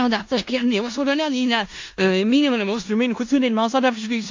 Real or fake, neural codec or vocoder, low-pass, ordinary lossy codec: fake; codec, 16 kHz in and 24 kHz out, 0.4 kbps, LongCat-Audio-Codec, four codebook decoder; 7.2 kHz; AAC, 48 kbps